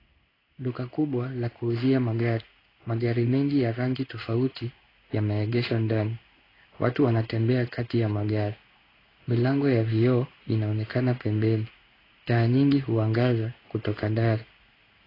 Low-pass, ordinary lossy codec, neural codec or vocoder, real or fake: 5.4 kHz; AAC, 24 kbps; codec, 16 kHz in and 24 kHz out, 1 kbps, XY-Tokenizer; fake